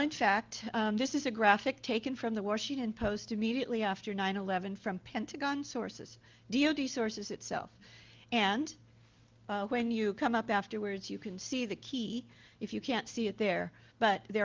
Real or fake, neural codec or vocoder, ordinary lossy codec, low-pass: real; none; Opus, 24 kbps; 7.2 kHz